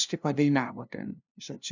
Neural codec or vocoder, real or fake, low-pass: codec, 16 kHz, 0.5 kbps, FunCodec, trained on LibriTTS, 25 frames a second; fake; 7.2 kHz